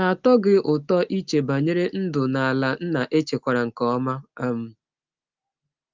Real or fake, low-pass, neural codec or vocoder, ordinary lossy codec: real; 7.2 kHz; none; Opus, 32 kbps